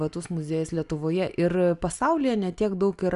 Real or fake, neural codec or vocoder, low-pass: real; none; 10.8 kHz